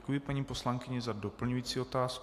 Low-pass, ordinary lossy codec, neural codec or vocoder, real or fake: 14.4 kHz; AAC, 64 kbps; none; real